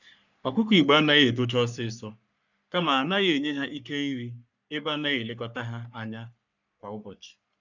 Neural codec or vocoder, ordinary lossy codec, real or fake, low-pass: codec, 44.1 kHz, 3.4 kbps, Pupu-Codec; none; fake; 7.2 kHz